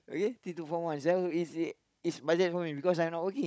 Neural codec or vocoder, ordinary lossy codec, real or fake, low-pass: codec, 16 kHz, 16 kbps, FunCodec, trained on Chinese and English, 50 frames a second; none; fake; none